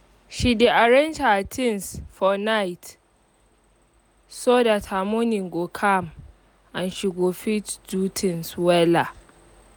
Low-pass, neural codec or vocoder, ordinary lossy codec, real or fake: none; none; none; real